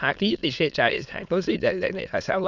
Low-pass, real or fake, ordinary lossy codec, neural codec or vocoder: 7.2 kHz; fake; none; autoencoder, 22.05 kHz, a latent of 192 numbers a frame, VITS, trained on many speakers